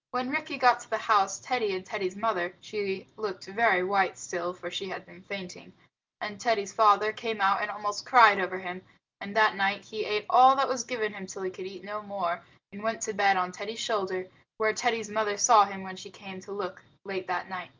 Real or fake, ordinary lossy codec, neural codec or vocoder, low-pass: real; Opus, 32 kbps; none; 7.2 kHz